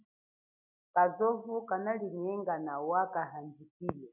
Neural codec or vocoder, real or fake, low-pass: none; real; 3.6 kHz